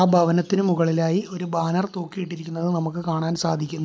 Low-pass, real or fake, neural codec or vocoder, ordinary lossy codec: none; real; none; none